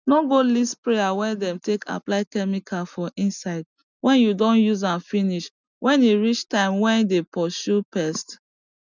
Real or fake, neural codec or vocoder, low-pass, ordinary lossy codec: real; none; 7.2 kHz; none